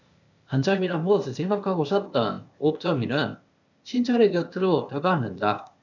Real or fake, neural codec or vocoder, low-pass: fake; codec, 16 kHz, 0.8 kbps, ZipCodec; 7.2 kHz